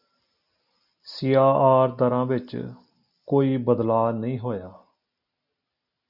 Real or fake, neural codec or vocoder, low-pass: real; none; 5.4 kHz